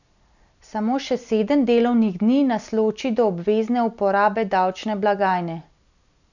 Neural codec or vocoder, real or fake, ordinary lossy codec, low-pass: none; real; none; 7.2 kHz